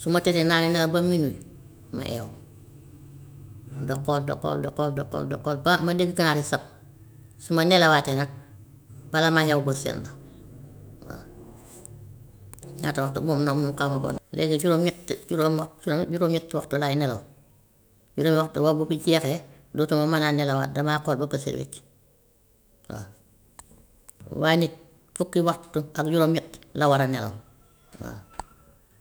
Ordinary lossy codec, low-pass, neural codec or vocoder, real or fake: none; none; autoencoder, 48 kHz, 128 numbers a frame, DAC-VAE, trained on Japanese speech; fake